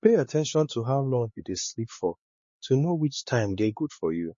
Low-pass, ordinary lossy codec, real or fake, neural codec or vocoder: 7.2 kHz; MP3, 32 kbps; fake; codec, 16 kHz, 4 kbps, X-Codec, HuBERT features, trained on LibriSpeech